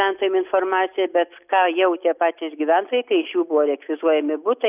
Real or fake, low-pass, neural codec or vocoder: real; 3.6 kHz; none